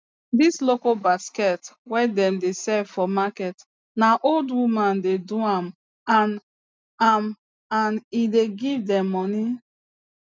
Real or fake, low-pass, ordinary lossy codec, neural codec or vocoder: real; none; none; none